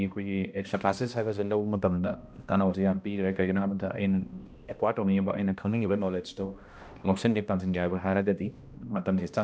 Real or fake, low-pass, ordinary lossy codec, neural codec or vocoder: fake; none; none; codec, 16 kHz, 1 kbps, X-Codec, HuBERT features, trained on balanced general audio